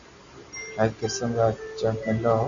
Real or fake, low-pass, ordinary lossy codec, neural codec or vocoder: real; 7.2 kHz; AAC, 64 kbps; none